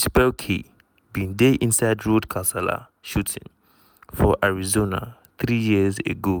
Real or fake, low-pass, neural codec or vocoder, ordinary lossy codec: real; none; none; none